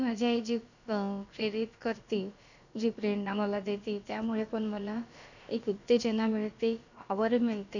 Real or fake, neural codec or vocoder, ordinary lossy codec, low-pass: fake; codec, 16 kHz, about 1 kbps, DyCAST, with the encoder's durations; none; 7.2 kHz